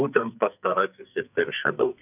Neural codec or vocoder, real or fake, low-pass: codec, 44.1 kHz, 2.6 kbps, SNAC; fake; 3.6 kHz